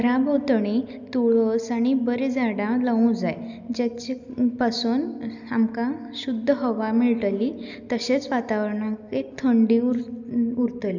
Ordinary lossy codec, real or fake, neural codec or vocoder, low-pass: none; real; none; 7.2 kHz